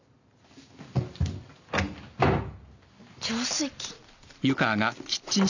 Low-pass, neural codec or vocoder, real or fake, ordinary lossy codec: 7.2 kHz; none; real; none